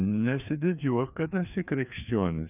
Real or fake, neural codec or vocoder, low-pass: fake; codec, 16 kHz, 2 kbps, FreqCodec, larger model; 3.6 kHz